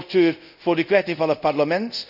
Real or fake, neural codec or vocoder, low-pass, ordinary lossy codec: fake; codec, 24 kHz, 0.5 kbps, DualCodec; 5.4 kHz; none